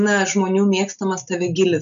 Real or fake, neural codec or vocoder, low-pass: real; none; 7.2 kHz